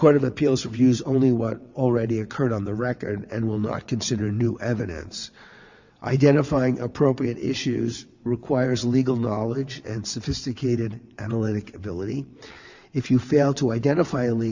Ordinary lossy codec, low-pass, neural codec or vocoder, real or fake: Opus, 64 kbps; 7.2 kHz; vocoder, 22.05 kHz, 80 mel bands, WaveNeXt; fake